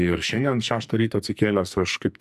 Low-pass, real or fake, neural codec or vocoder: 14.4 kHz; fake; codec, 44.1 kHz, 2.6 kbps, SNAC